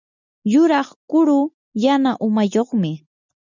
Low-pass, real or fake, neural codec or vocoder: 7.2 kHz; real; none